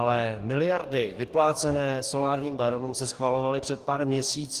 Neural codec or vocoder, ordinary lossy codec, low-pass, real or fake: codec, 44.1 kHz, 2.6 kbps, DAC; Opus, 24 kbps; 14.4 kHz; fake